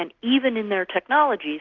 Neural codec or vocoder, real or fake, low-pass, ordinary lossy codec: none; real; 7.2 kHz; Opus, 16 kbps